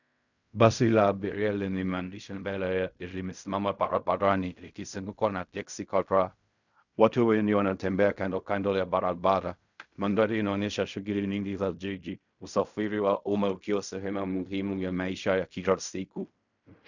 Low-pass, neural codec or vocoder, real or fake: 7.2 kHz; codec, 16 kHz in and 24 kHz out, 0.4 kbps, LongCat-Audio-Codec, fine tuned four codebook decoder; fake